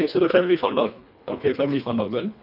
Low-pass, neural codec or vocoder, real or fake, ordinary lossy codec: 5.4 kHz; codec, 24 kHz, 1.5 kbps, HILCodec; fake; none